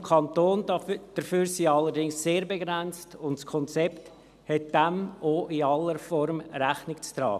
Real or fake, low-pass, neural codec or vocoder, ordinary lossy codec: real; 14.4 kHz; none; none